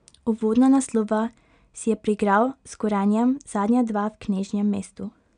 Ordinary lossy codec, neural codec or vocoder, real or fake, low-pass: none; none; real; 9.9 kHz